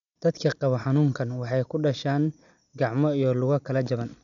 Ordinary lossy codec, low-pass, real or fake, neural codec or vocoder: none; 7.2 kHz; real; none